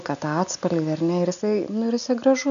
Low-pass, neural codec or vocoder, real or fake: 7.2 kHz; none; real